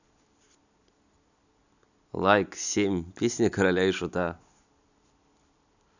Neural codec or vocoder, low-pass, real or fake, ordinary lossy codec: none; 7.2 kHz; real; none